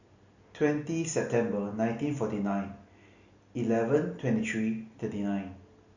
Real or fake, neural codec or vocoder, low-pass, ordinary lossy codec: real; none; 7.2 kHz; Opus, 64 kbps